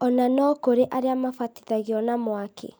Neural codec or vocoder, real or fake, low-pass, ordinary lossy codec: none; real; none; none